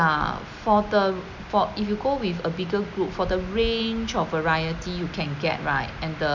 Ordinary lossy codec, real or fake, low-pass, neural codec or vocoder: none; real; 7.2 kHz; none